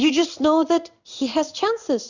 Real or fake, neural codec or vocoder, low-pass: fake; codec, 16 kHz in and 24 kHz out, 1 kbps, XY-Tokenizer; 7.2 kHz